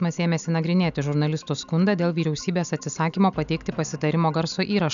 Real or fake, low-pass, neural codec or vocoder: real; 7.2 kHz; none